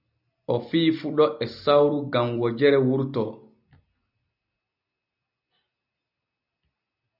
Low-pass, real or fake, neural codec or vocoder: 5.4 kHz; real; none